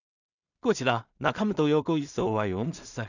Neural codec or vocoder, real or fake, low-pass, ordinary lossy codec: codec, 16 kHz in and 24 kHz out, 0.4 kbps, LongCat-Audio-Codec, two codebook decoder; fake; 7.2 kHz; none